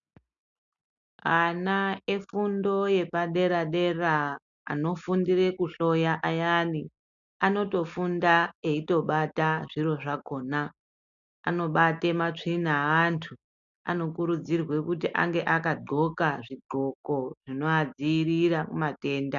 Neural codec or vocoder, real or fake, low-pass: none; real; 7.2 kHz